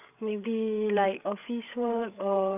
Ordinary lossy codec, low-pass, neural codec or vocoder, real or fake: none; 3.6 kHz; codec, 16 kHz, 8 kbps, FreqCodec, larger model; fake